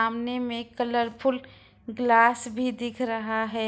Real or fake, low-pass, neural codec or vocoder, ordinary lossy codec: real; none; none; none